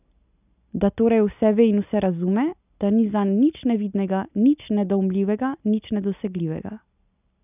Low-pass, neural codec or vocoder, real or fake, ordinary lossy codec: 3.6 kHz; none; real; none